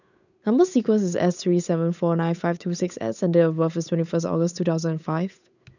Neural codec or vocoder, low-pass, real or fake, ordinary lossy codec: codec, 16 kHz, 8 kbps, FunCodec, trained on Chinese and English, 25 frames a second; 7.2 kHz; fake; none